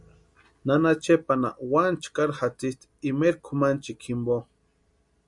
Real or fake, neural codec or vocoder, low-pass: real; none; 10.8 kHz